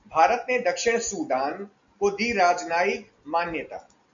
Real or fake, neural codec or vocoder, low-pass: real; none; 7.2 kHz